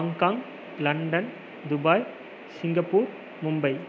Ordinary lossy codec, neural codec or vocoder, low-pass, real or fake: none; none; none; real